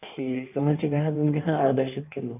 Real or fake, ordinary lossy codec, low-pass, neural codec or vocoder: fake; none; 3.6 kHz; codec, 16 kHz in and 24 kHz out, 1.1 kbps, FireRedTTS-2 codec